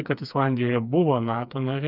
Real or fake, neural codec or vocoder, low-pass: fake; codec, 16 kHz, 4 kbps, FreqCodec, smaller model; 5.4 kHz